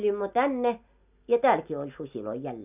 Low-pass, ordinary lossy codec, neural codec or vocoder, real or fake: 3.6 kHz; none; none; real